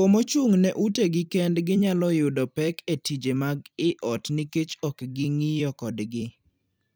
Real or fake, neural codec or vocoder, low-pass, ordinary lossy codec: fake; vocoder, 44.1 kHz, 128 mel bands every 256 samples, BigVGAN v2; none; none